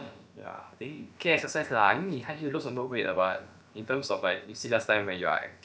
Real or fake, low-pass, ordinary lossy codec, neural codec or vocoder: fake; none; none; codec, 16 kHz, about 1 kbps, DyCAST, with the encoder's durations